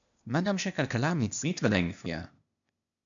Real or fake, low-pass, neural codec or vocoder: fake; 7.2 kHz; codec, 16 kHz, 0.8 kbps, ZipCodec